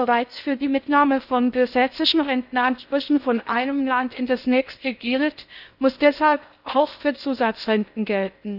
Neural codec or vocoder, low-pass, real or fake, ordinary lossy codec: codec, 16 kHz in and 24 kHz out, 0.6 kbps, FocalCodec, streaming, 4096 codes; 5.4 kHz; fake; none